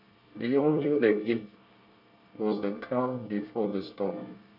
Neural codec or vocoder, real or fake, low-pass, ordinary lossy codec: codec, 24 kHz, 1 kbps, SNAC; fake; 5.4 kHz; MP3, 48 kbps